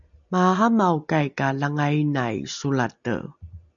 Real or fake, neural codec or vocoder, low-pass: real; none; 7.2 kHz